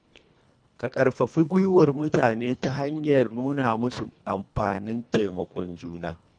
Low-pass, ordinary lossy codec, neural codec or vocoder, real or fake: 9.9 kHz; none; codec, 24 kHz, 1.5 kbps, HILCodec; fake